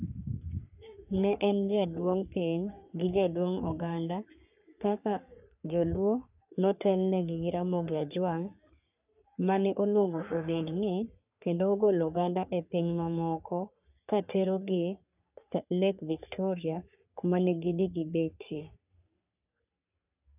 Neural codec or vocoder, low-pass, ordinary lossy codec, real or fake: codec, 44.1 kHz, 3.4 kbps, Pupu-Codec; 3.6 kHz; none; fake